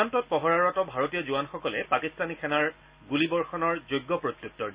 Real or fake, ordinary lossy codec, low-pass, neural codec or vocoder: real; Opus, 64 kbps; 3.6 kHz; none